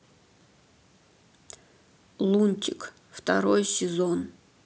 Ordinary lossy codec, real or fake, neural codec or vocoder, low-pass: none; real; none; none